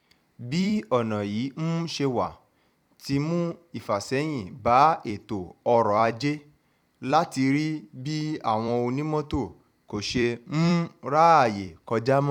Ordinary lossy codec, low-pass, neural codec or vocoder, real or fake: none; 19.8 kHz; vocoder, 44.1 kHz, 128 mel bands every 256 samples, BigVGAN v2; fake